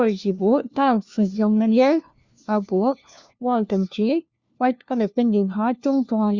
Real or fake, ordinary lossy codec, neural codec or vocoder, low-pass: fake; Opus, 64 kbps; codec, 16 kHz, 1 kbps, FunCodec, trained on LibriTTS, 50 frames a second; 7.2 kHz